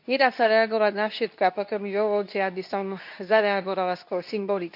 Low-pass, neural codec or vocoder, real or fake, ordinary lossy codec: 5.4 kHz; codec, 24 kHz, 0.9 kbps, WavTokenizer, medium speech release version 2; fake; none